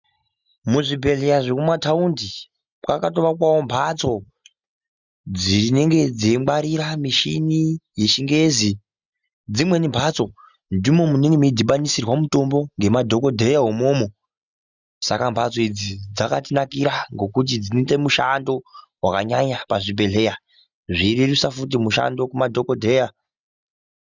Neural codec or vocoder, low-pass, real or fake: none; 7.2 kHz; real